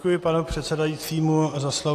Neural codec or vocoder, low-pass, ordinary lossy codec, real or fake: none; 14.4 kHz; AAC, 48 kbps; real